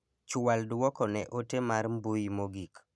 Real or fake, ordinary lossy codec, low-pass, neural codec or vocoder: real; none; none; none